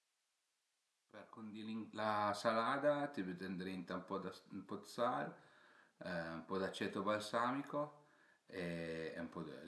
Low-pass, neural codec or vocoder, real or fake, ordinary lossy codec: none; none; real; none